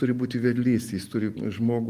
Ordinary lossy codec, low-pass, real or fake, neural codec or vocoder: Opus, 24 kbps; 14.4 kHz; real; none